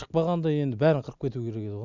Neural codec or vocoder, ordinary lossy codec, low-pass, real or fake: none; none; 7.2 kHz; real